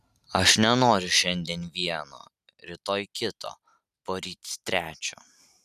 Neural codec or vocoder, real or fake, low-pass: none; real; 14.4 kHz